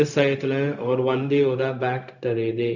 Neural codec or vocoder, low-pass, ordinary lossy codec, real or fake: codec, 16 kHz, 0.4 kbps, LongCat-Audio-Codec; 7.2 kHz; none; fake